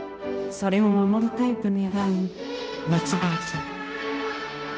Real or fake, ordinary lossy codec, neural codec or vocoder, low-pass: fake; none; codec, 16 kHz, 0.5 kbps, X-Codec, HuBERT features, trained on balanced general audio; none